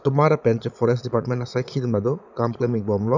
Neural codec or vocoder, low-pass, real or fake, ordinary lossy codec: vocoder, 22.05 kHz, 80 mel bands, Vocos; 7.2 kHz; fake; none